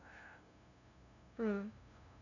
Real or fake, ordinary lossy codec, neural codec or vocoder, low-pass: fake; none; codec, 16 kHz, 0.5 kbps, FunCodec, trained on LibriTTS, 25 frames a second; 7.2 kHz